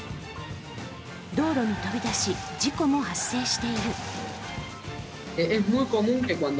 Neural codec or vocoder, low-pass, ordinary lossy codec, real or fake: none; none; none; real